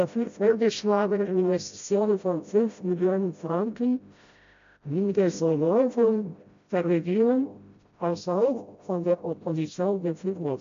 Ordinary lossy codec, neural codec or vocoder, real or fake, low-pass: MP3, 64 kbps; codec, 16 kHz, 0.5 kbps, FreqCodec, smaller model; fake; 7.2 kHz